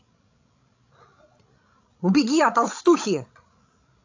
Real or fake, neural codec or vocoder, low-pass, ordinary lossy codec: fake; codec, 16 kHz, 16 kbps, FreqCodec, larger model; 7.2 kHz; AAC, 48 kbps